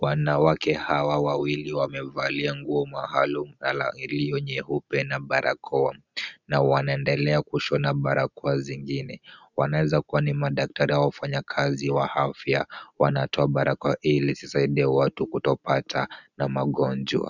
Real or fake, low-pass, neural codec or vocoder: real; 7.2 kHz; none